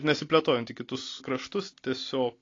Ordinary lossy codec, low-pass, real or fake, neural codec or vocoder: AAC, 32 kbps; 7.2 kHz; real; none